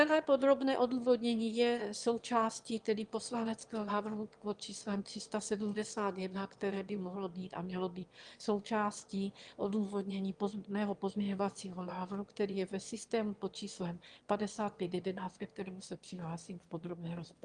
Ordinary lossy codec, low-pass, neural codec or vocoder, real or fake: Opus, 24 kbps; 9.9 kHz; autoencoder, 22.05 kHz, a latent of 192 numbers a frame, VITS, trained on one speaker; fake